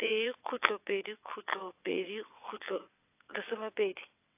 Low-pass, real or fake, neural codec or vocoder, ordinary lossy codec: 3.6 kHz; fake; vocoder, 22.05 kHz, 80 mel bands, WaveNeXt; AAC, 24 kbps